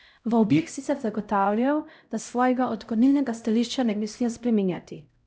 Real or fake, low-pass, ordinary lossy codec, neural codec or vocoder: fake; none; none; codec, 16 kHz, 0.5 kbps, X-Codec, HuBERT features, trained on LibriSpeech